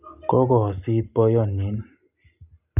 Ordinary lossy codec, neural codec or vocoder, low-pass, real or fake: none; none; 3.6 kHz; real